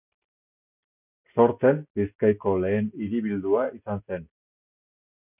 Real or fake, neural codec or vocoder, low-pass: real; none; 3.6 kHz